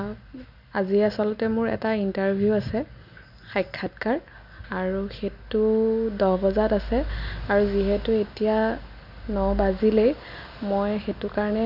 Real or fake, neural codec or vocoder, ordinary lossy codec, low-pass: real; none; none; 5.4 kHz